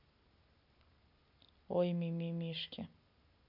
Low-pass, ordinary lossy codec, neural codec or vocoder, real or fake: 5.4 kHz; none; none; real